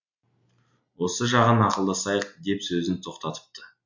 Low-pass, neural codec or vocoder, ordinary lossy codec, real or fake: 7.2 kHz; none; MP3, 48 kbps; real